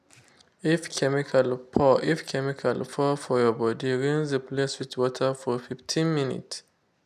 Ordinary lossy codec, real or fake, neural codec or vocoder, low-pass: none; real; none; 14.4 kHz